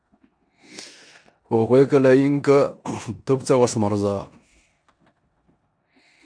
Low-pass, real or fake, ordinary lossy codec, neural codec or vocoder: 9.9 kHz; fake; MP3, 64 kbps; codec, 16 kHz in and 24 kHz out, 0.9 kbps, LongCat-Audio-Codec, four codebook decoder